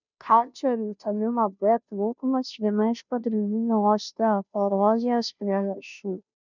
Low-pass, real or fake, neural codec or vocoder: 7.2 kHz; fake; codec, 16 kHz, 0.5 kbps, FunCodec, trained on Chinese and English, 25 frames a second